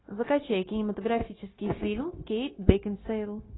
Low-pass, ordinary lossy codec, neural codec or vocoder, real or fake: 7.2 kHz; AAC, 16 kbps; codec, 16 kHz, 0.9 kbps, LongCat-Audio-Codec; fake